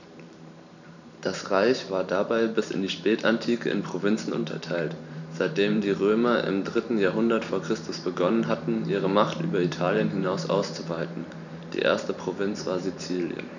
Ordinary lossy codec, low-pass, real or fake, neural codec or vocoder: none; 7.2 kHz; fake; vocoder, 44.1 kHz, 128 mel bands every 512 samples, BigVGAN v2